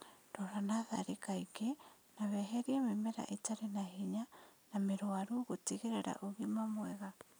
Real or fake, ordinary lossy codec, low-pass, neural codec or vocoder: real; none; none; none